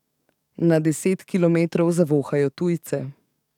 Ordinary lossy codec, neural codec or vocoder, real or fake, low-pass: none; codec, 44.1 kHz, 7.8 kbps, DAC; fake; 19.8 kHz